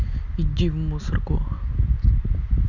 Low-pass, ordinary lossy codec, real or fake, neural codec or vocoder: 7.2 kHz; none; real; none